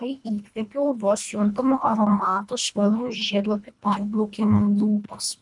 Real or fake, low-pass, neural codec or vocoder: fake; 10.8 kHz; codec, 24 kHz, 1.5 kbps, HILCodec